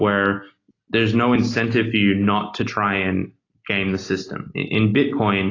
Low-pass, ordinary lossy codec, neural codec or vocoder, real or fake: 7.2 kHz; AAC, 32 kbps; none; real